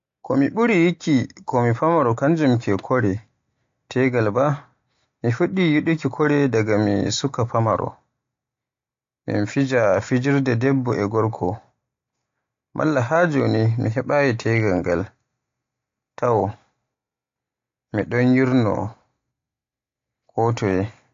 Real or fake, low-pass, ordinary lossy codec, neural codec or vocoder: real; 7.2 kHz; AAC, 48 kbps; none